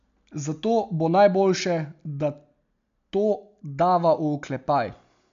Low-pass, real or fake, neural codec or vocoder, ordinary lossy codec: 7.2 kHz; real; none; MP3, 64 kbps